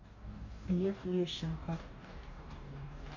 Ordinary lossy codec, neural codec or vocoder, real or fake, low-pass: none; codec, 44.1 kHz, 2.6 kbps, DAC; fake; 7.2 kHz